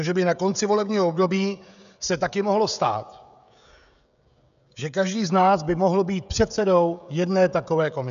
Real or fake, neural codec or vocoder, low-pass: fake; codec, 16 kHz, 16 kbps, FreqCodec, smaller model; 7.2 kHz